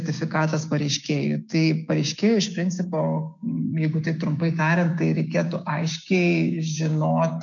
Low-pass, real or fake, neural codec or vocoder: 7.2 kHz; fake; codec, 16 kHz, 6 kbps, DAC